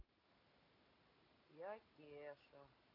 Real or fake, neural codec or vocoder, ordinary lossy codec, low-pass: real; none; AAC, 24 kbps; 5.4 kHz